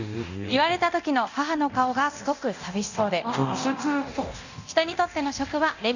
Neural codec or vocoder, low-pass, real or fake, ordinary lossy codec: codec, 24 kHz, 0.9 kbps, DualCodec; 7.2 kHz; fake; none